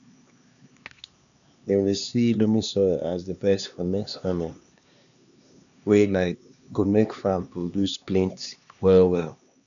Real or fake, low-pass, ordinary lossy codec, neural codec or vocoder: fake; 7.2 kHz; none; codec, 16 kHz, 2 kbps, X-Codec, HuBERT features, trained on LibriSpeech